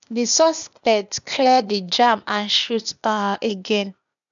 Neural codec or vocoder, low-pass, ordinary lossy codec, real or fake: codec, 16 kHz, 0.8 kbps, ZipCodec; 7.2 kHz; none; fake